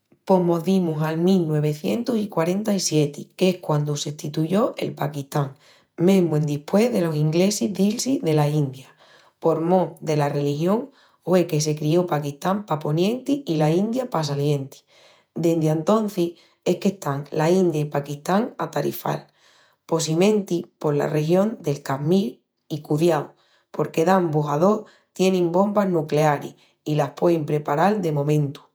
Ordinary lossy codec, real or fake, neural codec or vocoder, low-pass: none; fake; vocoder, 48 kHz, 128 mel bands, Vocos; none